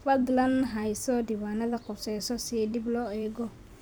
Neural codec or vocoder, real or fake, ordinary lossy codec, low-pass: vocoder, 44.1 kHz, 128 mel bands every 256 samples, BigVGAN v2; fake; none; none